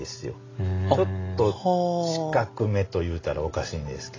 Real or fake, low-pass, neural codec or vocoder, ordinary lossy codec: real; 7.2 kHz; none; AAC, 32 kbps